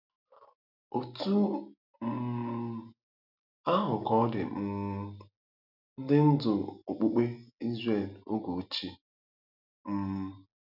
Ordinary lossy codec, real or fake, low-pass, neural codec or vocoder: none; real; 5.4 kHz; none